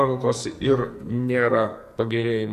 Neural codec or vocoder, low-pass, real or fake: codec, 44.1 kHz, 2.6 kbps, SNAC; 14.4 kHz; fake